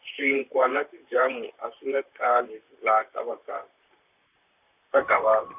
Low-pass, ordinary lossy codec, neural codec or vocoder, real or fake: 3.6 kHz; none; vocoder, 44.1 kHz, 128 mel bands, Pupu-Vocoder; fake